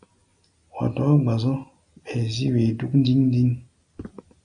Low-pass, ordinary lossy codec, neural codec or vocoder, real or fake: 9.9 kHz; AAC, 64 kbps; none; real